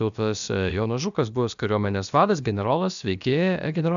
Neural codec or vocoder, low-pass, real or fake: codec, 16 kHz, about 1 kbps, DyCAST, with the encoder's durations; 7.2 kHz; fake